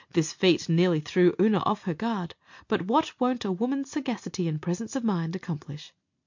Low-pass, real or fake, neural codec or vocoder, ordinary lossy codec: 7.2 kHz; real; none; MP3, 48 kbps